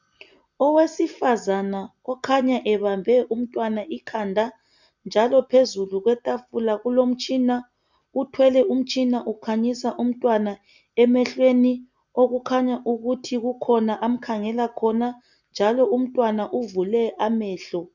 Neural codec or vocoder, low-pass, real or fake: none; 7.2 kHz; real